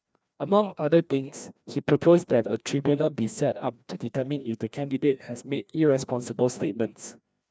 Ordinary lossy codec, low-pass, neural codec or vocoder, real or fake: none; none; codec, 16 kHz, 1 kbps, FreqCodec, larger model; fake